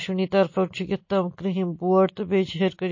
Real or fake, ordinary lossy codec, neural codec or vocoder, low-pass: real; MP3, 32 kbps; none; 7.2 kHz